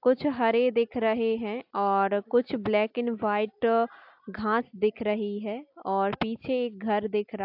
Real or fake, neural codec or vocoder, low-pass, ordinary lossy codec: real; none; 5.4 kHz; AAC, 48 kbps